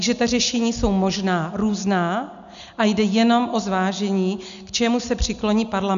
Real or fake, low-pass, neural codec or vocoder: real; 7.2 kHz; none